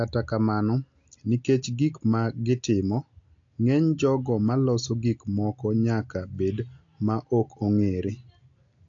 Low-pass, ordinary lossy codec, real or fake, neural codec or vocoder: 7.2 kHz; AAC, 48 kbps; real; none